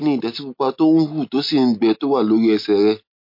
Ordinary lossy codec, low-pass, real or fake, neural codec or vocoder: MP3, 32 kbps; 5.4 kHz; real; none